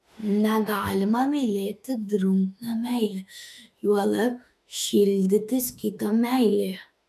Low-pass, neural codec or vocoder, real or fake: 14.4 kHz; autoencoder, 48 kHz, 32 numbers a frame, DAC-VAE, trained on Japanese speech; fake